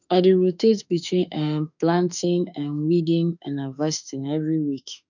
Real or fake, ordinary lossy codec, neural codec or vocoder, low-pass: fake; none; autoencoder, 48 kHz, 32 numbers a frame, DAC-VAE, trained on Japanese speech; 7.2 kHz